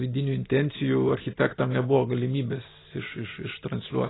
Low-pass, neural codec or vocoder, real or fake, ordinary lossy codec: 7.2 kHz; none; real; AAC, 16 kbps